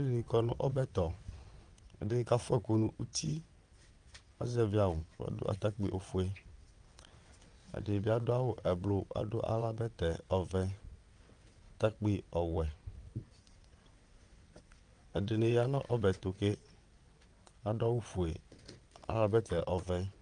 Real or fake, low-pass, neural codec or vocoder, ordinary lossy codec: fake; 9.9 kHz; vocoder, 22.05 kHz, 80 mel bands, WaveNeXt; Opus, 32 kbps